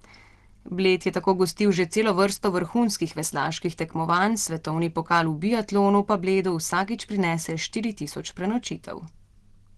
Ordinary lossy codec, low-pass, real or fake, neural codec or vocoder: Opus, 16 kbps; 10.8 kHz; real; none